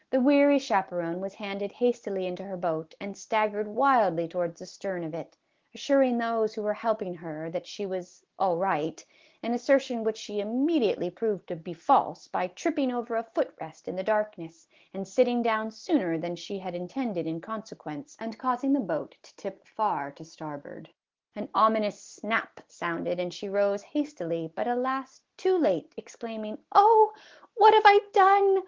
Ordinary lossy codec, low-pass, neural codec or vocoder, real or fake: Opus, 16 kbps; 7.2 kHz; none; real